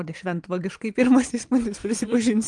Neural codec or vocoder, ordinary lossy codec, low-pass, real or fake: vocoder, 22.05 kHz, 80 mel bands, Vocos; Opus, 24 kbps; 9.9 kHz; fake